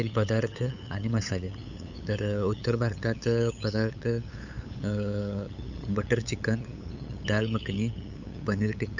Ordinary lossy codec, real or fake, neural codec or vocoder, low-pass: none; fake; codec, 16 kHz, 8 kbps, FunCodec, trained on LibriTTS, 25 frames a second; 7.2 kHz